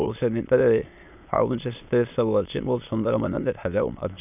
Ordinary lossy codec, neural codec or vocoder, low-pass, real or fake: none; autoencoder, 22.05 kHz, a latent of 192 numbers a frame, VITS, trained on many speakers; 3.6 kHz; fake